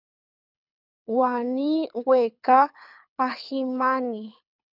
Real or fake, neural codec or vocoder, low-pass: fake; codec, 24 kHz, 6 kbps, HILCodec; 5.4 kHz